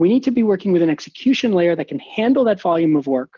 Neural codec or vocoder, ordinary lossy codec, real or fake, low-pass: none; Opus, 32 kbps; real; 7.2 kHz